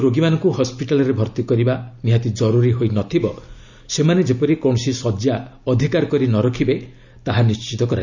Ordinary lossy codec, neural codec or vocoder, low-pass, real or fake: none; none; 7.2 kHz; real